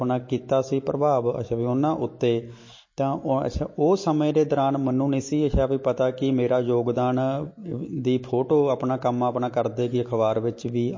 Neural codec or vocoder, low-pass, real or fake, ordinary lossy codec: none; 7.2 kHz; real; MP3, 32 kbps